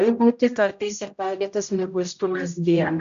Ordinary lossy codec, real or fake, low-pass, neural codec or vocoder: MP3, 64 kbps; fake; 7.2 kHz; codec, 16 kHz, 0.5 kbps, X-Codec, HuBERT features, trained on general audio